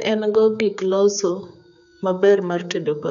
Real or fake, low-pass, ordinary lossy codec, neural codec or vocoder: fake; 7.2 kHz; none; codec, 16 kHz, 4 kbps, X-Codec, HuBERT features, trained on general audio